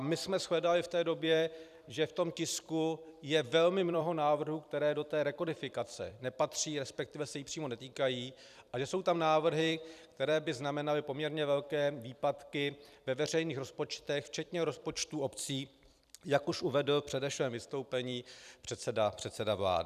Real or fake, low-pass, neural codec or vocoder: fake; 14.4 kHz; vocoder, 44.1 kHz, 128 mel bands every 256 samples, BigVGAN v2